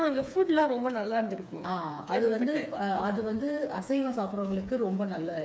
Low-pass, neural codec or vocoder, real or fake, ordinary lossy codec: none; codec, 16 kHz, 4 kbps, FreqCodec, smaller model; fake; none